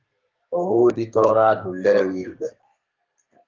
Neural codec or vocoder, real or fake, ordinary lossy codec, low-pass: codec, 32 kHz, 1.9 kbps, SNAC; fake; Opus, 32 kbps; 7.2 kHz